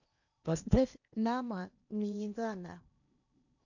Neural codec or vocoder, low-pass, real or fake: codec, 16 kHz in and 24 kHz out, 0.8 kbps, FocalCodec, streaming, 65536 codes; 7.2 kHz; fake